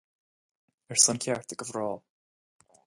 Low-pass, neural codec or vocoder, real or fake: 10.8 kHz; none; real